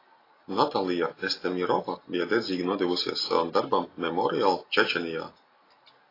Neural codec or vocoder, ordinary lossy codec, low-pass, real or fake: none; AAC, 24 kbps; 5.4 kHz; real